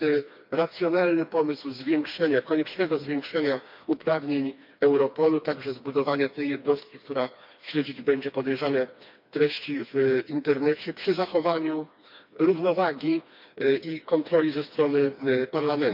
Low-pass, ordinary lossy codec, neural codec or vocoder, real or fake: 5.4 kHz; MP3, 48 kbps; codec, 16 kHz, 2 kbps, FreqCodec, smaller model; fake